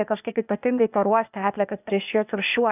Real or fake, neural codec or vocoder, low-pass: fake; codec, 16 kHz, 0.8 kbps, ZipCodec; 3.6 kHz